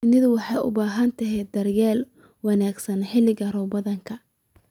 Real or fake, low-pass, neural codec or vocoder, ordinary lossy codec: real; 19.8 kHz; none; none